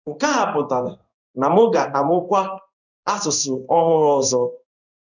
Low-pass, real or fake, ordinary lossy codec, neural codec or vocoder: 7.2 kHz; fake; none; codec, 16 kHz in and 24 kHz out, 1 kbps, XY-Tokenizer